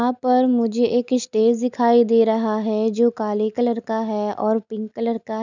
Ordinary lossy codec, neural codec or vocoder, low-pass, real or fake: none; none; 7.2 kHz; real